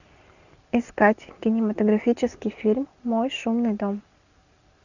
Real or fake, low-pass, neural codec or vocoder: real; 7.2 kHz; none